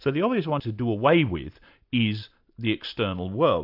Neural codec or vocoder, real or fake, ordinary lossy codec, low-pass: none; real; AAC, 48 kbps; 5.4 kHz